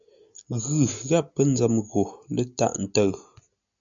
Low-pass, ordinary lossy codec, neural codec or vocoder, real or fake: 7.2 kHz; MP3, 96 kbps; none; real